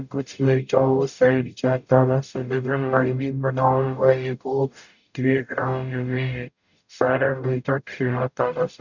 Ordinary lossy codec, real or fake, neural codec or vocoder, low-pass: none; fake; codec, 44.1 kHz, 0.9 kbps, DAC; 7.2 kHz